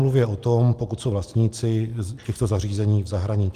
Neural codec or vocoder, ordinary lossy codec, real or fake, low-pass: none; Opus, 24 kbps; real; 14.4 kHz